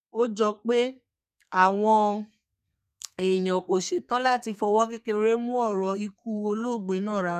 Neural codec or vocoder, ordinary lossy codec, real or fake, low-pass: codec, 32 kHz, 1.9 kbps, SNAC; none; fake; 14.4 kHz